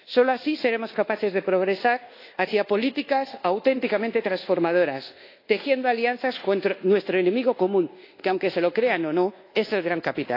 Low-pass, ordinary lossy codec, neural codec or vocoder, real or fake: 5.4 kHz; AAC, 32 kbps; codec, 24 kHz, 1.2 kbps, DualCodec; fake